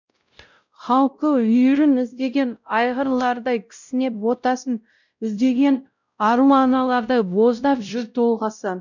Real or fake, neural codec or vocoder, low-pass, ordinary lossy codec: fake; codec, 16 kHz, 0.5 kbps, X-Codec, WavLM features, trained on Multilingual LibriSpeech; 7.2 kHz; none